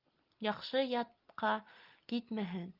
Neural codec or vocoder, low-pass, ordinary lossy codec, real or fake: none; 5.4 kHz; Opus, 24 kbps; real